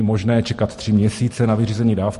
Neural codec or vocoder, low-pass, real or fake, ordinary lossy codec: none; 14.4 kHz; real; MP3, 48 kbps